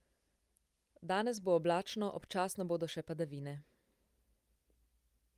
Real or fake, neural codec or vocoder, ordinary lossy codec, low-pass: real; none; Opus, 32 kbps; 14.4 kHz